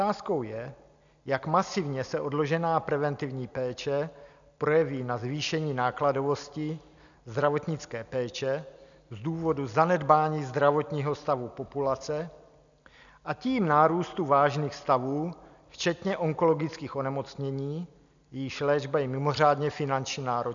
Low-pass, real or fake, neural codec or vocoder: 7.2 kHz; real; none